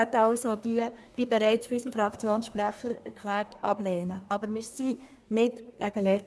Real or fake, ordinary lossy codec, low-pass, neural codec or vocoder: fake; none; none; codec, 24 kHz, 1 kbps, SNAC